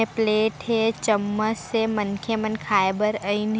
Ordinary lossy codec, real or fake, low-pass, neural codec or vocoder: none; real; none; none